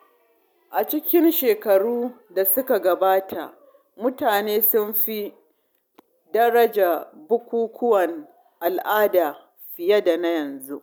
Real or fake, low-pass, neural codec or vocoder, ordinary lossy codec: real; none; none; none